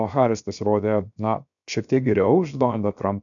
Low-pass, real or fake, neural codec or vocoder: 7.2 kHz; fake; codec, 16 kHz, 0.7 kbps, FocalCodec